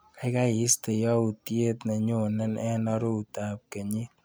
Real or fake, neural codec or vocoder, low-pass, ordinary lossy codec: real; none; none; none